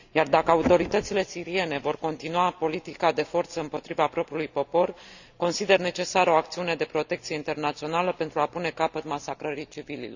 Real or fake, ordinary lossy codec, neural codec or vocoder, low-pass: real; none; none; 7.2 kHz